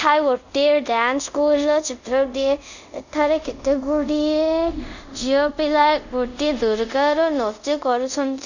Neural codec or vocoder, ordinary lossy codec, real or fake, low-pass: codec, 24 kHz, 0.5 kbps, DualCodec; none; fake; 7.2 kHz